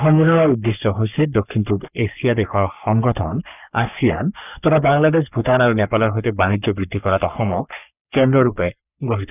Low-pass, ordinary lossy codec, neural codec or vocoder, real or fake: 3.6 kHz; none; codec, 44.1 kHz, 3.4 kbps, Pupu-Codec; fake